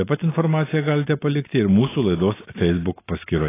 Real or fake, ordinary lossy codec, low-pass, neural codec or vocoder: real; AAC, 16 kbps; 3.6 kHz; none